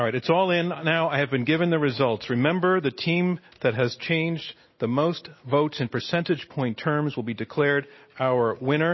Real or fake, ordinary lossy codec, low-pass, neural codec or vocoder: real; MP3, 24 kbps; 7.2 kHz; none